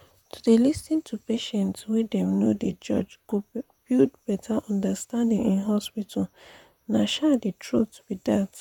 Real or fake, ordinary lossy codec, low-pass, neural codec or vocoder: fake; none; 19.8 kHz; vocoder, 44.1 kHz, 128 mel bands, Pupu-Vocoder